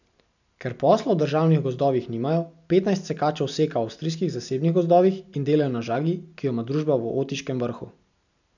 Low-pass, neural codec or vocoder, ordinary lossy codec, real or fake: 7.2 kHz; none; none; real